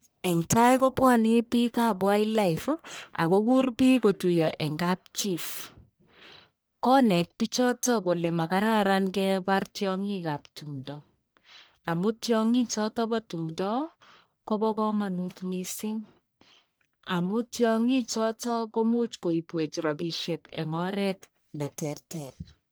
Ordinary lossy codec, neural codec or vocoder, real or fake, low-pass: none; codec, 44.1 kHz, 1.7 kbps, Pupu-Codec; fake; none